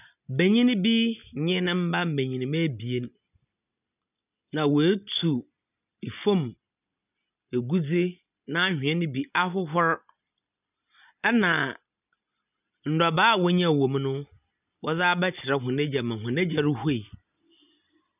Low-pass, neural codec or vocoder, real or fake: 3.6 kHz; none; real